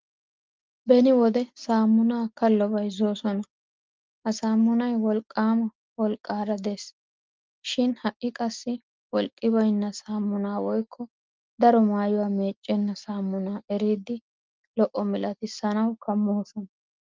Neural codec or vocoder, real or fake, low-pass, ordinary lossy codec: none; real; 7.2 kHz; Opus, 32 kbps